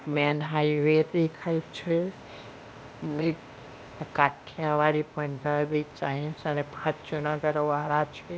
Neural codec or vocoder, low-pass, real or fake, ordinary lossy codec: codec, 16 kHz, 0.8 kbps, ZipCodec; none; fake; none